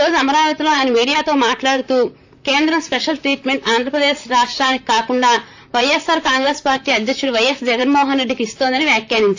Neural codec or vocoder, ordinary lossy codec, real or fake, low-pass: vocoder, 44.1 kHz, 128 mel bands, Pupu-Vocoder; AAC, 48 kbps; fake; 7.2 kHz